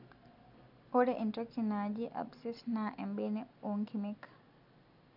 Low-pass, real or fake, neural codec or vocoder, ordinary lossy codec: 5.4 kHz; real; none; none